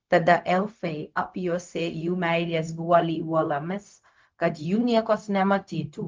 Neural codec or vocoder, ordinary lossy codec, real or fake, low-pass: codec, 16 kHz, 0.4 kbps, LongCat-Audio-Codec; Opus, 24 kbps; fake; 7.2 kHz